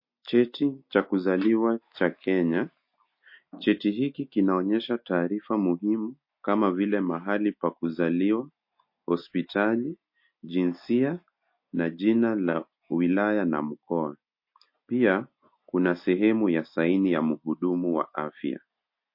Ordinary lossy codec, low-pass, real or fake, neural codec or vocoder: MP3, 32 kbps; 5.4 kHz; real; none